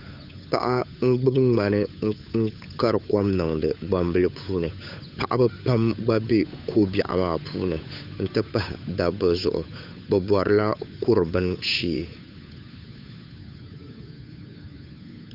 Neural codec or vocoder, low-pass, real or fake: codec, 16 kHz, 8 kbps, FunCodec, trained on Chinese and English, 25 frames a second; 5.4 kHz; fake